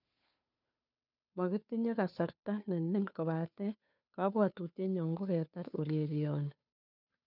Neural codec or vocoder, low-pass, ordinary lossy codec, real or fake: codec, 16 kHz, 2 kbps, FunCodec, trained on Chinese and English, 25 frames a second; 5.4 kHz; none; fake